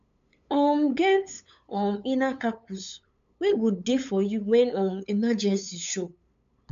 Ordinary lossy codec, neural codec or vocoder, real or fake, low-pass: AAC, 64 kbps; codec, 16 kHz, 8 kbps, FunCodec, trained on LibriTTS, 25 frames a second; fake; 7.2 kHz